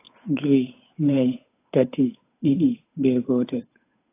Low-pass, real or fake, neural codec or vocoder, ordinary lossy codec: 3.6 kHz; fake; codec, 16 kHz, 8 kbps, FunCodec, trained on Chinese and English, 25 frames a second; AAC, 24 kbps